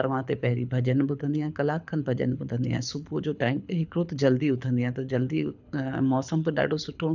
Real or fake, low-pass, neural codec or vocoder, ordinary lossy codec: fake; 7.2 kHz; codec, 24 kHz, 6 kbps, HILCodec; none